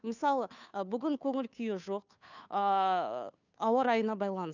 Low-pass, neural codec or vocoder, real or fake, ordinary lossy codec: 7.2 kHz; codec, 16 kHz, 2 kbps, FunCodec, trained on Chinese and English, 25 frames a second; fake; none